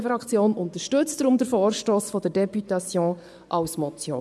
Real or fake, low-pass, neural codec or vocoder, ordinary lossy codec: fake; none; vocoder, 24 kHz, 100 mel bands, Vocos; none